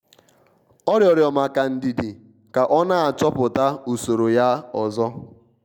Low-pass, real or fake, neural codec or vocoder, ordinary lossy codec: 19.8 kHz; real; none; none